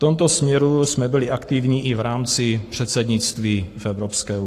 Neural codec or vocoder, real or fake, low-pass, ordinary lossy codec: codec, 44.1 kHz, 7.8 kbps, Pupu-Codec; fake; 14.4 kHz; AAC, 48 kbps